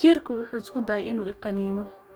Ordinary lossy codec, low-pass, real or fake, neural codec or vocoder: none; none; fake; codec, 44.1 kHz, 2.6 kbps, DAC